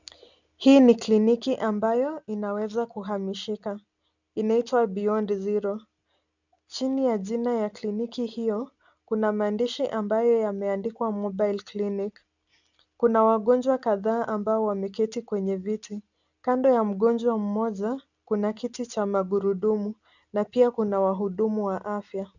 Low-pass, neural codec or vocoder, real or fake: 7.2 kHz; none; real